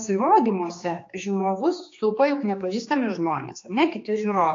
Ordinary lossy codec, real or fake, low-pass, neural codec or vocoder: AAC, 48 kbps; fake; 7.2 kHz; codec, 16 kHz, 2 kbps, X-Codec, HuBERT features, trained on general audio